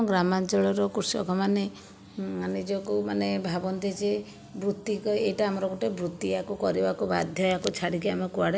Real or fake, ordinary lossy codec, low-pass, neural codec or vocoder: real; none; none; none